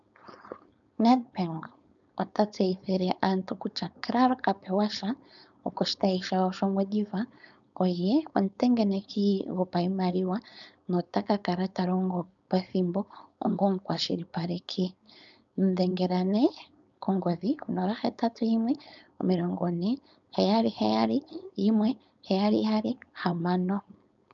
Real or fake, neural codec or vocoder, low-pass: fake; codec, 16 kHz, 4.8 kbps, FACodec; 7.2 kHz